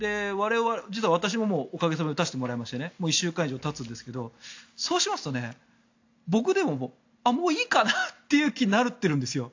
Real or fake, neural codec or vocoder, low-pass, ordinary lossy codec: real; none; 7.2 kHz; AAC, 48 kbps